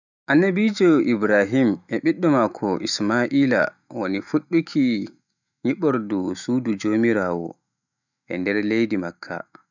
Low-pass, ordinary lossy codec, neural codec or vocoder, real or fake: 7.2 kHz; none; autoencoder, 48 kHz, 128 numbers a frame, DAC-VAE, trained on Japanese speech; fake